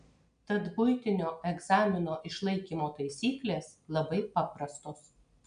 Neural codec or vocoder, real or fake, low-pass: none; real; 9.9 kHz